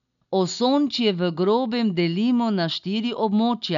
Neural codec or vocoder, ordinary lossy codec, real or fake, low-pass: none; none; real; 7.2 kHz